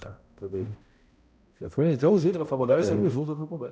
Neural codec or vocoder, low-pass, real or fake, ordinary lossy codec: codec, 16 kHz, 0.5 kbps, X-Codec, HuBERT features, trained on balanced general audio; none; fake; none